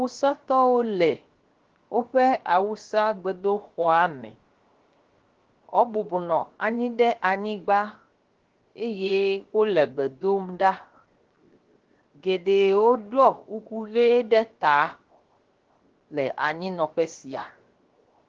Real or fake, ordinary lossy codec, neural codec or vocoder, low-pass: fake; Opus, 16 kbps; codec, 16 kHz, 0.7 kbps, FocalCodec; 7.2 kHz